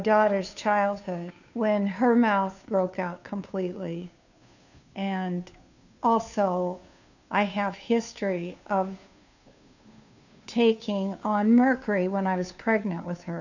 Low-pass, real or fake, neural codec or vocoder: 7.2 kHz; fake; codec, 16 kHz, 2 kbps, FunCodec, trained on Chinese and English, 25 frames a second